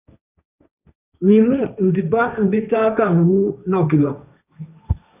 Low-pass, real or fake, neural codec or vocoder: 3.6 kHz; fake; codec, 16 kHz, 1.1 kbps, Voila-Tokenizer